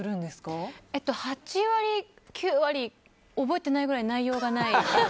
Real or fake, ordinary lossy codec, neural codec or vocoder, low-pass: real; none; none; none